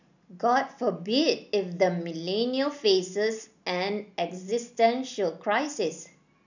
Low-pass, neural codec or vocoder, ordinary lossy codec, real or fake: 7.2 kHz; none; none; real